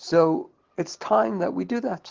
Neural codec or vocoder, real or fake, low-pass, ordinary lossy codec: none; real; 7.2 kHz; Opus, 16 kbps